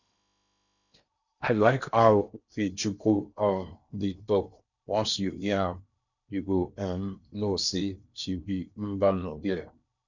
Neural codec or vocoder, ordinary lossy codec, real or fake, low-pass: codec, 16 kHz in and 24 kHz out, 0.8 kbps, FocalCodec, streaming, 65536 codes; none; fake; 7.2 kHz